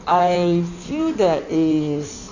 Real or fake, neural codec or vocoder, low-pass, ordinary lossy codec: fake; codec, 16 kHz in and 24 kHz out, 1.1 kbps, FireRedTTS-2 codec; 7.2 kHz; none